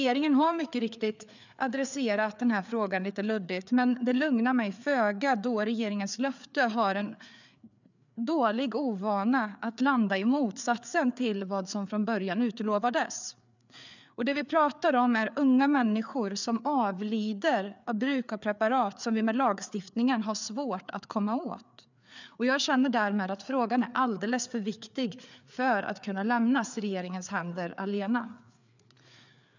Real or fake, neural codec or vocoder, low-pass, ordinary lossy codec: fake; codec, 16 kHz, 4 kbps, FreqCodec, larger model; 7.2 kHz; none